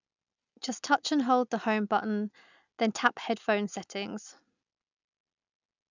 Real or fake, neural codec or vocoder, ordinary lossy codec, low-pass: real; none; none; 7.2 kHz